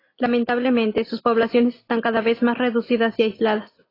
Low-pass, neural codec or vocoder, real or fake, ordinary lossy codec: 5.4 kHz; none; real; AAC, 24 kbps